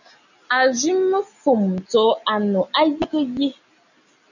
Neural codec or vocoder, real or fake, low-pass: none; real; 7.2 kHz